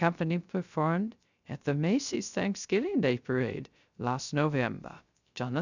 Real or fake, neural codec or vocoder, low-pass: fake; codec, 16 kHz, 0.3 kbps, FocalCodec; 7.2 kHz